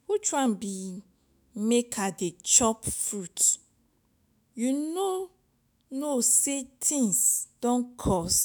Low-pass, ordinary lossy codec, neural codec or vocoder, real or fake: none; none; autoencoder, 48 kHz, 128 numbers a frame, DAC-VAE, trained on Japanese speech; fake